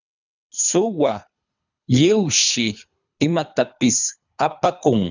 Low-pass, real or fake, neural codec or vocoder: 7.2 kHz; fake; codec, 24 kHz, 3 kbps, HILCodec